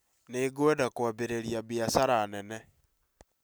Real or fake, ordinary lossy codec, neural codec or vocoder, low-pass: real; none; none; none